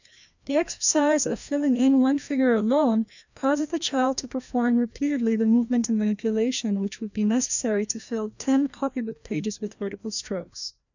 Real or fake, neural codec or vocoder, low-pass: fake; codec, 16 kHz, 1 kbps, FreqCodec, larger model; 7.2 kHz